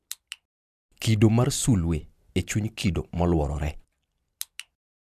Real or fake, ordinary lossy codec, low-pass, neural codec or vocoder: real; MP3, 96 kbps; 14.4 kHz; none